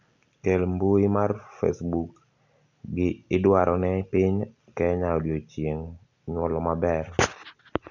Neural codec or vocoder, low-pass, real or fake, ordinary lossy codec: none; 7.2 kHz; real; none